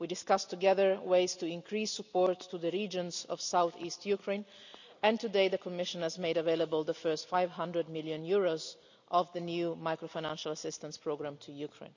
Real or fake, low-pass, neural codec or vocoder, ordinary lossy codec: real; 7.2 kHz; none; none